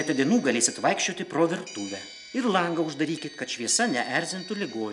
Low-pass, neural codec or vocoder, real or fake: 10.8 kHz; none; real